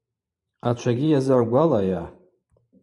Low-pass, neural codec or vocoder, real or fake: 10.8 kHz; vocoder, 44.1 kHz, 128 mel bands every 512 samples, BigVGAN v2; fake